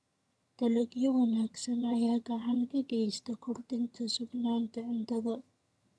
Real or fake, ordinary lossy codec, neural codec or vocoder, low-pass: fake; none; vocoder, 22.05 kHz, 80 mel bands, HiFi-GAN; none